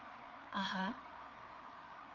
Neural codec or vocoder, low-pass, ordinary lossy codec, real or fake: codec, 16 kHz, 4 kbps, FreqCodec, larger model; 7.2 kHz; Opus, 32 kbps; fake